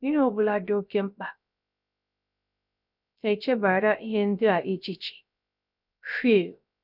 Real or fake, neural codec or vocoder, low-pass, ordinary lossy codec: fake; codec, 16 kHz, 0.3 kbps, FocalCodec; 5.4 kHz; none